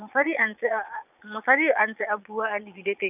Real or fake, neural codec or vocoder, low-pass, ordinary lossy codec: fake; vocoder, 22.05 kHz, 80 mel bands, Vocos; 3.6 kHz; none